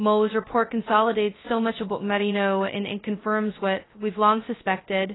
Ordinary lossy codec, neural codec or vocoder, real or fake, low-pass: AAC, 16 kbps; codec, 16 kHz, 0.2 kbps, FocalCodec; fake; 7.2 kHz